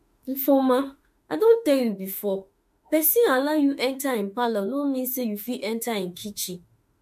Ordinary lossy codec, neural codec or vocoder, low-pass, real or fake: MP3, 64 kbps; autoencoder, 48 kHz, 32 numbers a frame, DAC-VAE, trained on Japanese speech; 14.4 kHz; fake